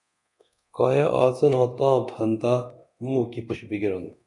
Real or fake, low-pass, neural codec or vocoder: fake; 10.8 kHz; codec, 24 kHz, 0.9 kbps, DualCodec